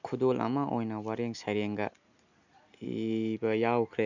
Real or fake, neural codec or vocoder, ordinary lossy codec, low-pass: real; none; none; 7.2 kHz